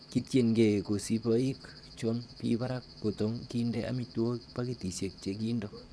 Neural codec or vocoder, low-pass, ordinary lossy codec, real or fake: vocoder, 22.05 kHz, 80 mel bands, Vocos; none; none; fake